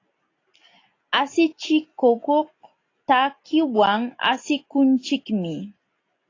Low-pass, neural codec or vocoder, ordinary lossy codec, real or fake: 7.2 kHz; none; AAC, 32 kbps; real